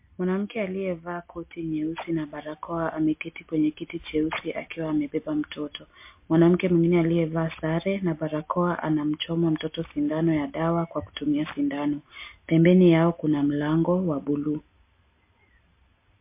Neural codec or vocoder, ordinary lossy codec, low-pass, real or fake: none; MP3, 24 kbps; 3.6 kHz; real